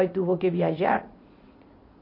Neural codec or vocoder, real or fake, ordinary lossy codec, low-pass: vocoder, 44.1 kHz, 80 mel bands, Vocos; fake; MP3, 32 kbps; 5.4 kHz